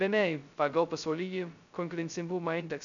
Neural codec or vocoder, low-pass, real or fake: codec, 16 kHz, 0.2 kbps, FocalCodec; 7.2 kHz; fake